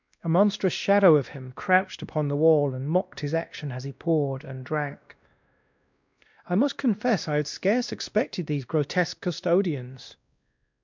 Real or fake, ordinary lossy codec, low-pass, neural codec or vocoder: fake; MP3, 64 kbps; 7.2 kHz; codec, 16 kHz, 1 kbps, X-Codec, WavLM features, trained on Multilingual LibriSpeech